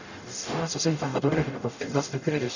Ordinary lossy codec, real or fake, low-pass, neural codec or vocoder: none; fake; 7.2 kHz; codec, 44.1 kHz, 0.9 kbps, DAC